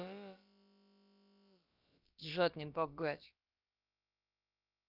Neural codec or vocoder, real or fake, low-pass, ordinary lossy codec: codec, 16 kHz, about 1 kbps, DyCAST, with the encoder's durations; fake; 5.4 kHz; none